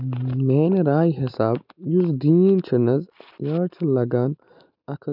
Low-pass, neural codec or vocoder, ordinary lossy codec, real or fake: 5.4 kHz; none; none; real